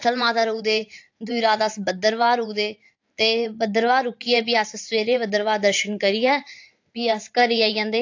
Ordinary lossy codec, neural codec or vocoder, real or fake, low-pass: AAC, 48 kbps; vocoder, 44.1 kHz, 128 mel bands every 512 samples, BigVGAN v2; fake; 7.2 kHz